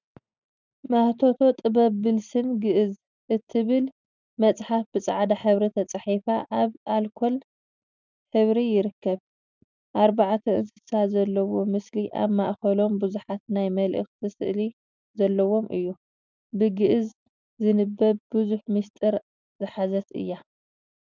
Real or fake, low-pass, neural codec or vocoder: real; 7.2 kHz; none